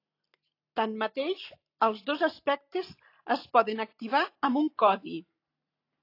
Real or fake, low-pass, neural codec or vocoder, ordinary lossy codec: real; 5.4 kHz; none; AAC, 32 kbps